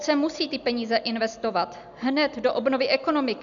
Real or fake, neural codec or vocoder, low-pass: real; none; 7.2 kHz